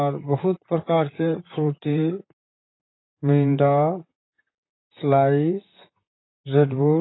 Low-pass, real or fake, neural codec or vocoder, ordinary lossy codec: 7.2 kHz; fake; vocoder, 44.1 kHz, 128 mel bands, Pupu-Vocoder; AAC, 16 kbps